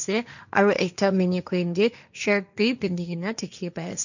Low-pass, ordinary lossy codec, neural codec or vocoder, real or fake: 7.2 kHz; none; codec, 16 kHz, 1.1 kbps, Voila-Tokenizer; fake